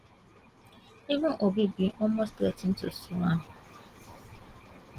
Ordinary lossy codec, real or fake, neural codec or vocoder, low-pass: Opus, 16 kbps; real; none; 14.4 kHz